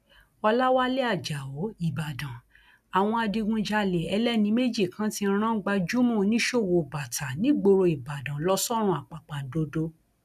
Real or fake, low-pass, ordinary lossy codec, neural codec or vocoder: real; 14.4 kHz; none; none